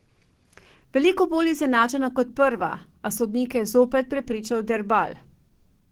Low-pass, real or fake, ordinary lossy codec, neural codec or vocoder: 19.8 kHz; fake; Opus, 16 kbps; codec, 44.1 kHz, 7.8 kbps, DAC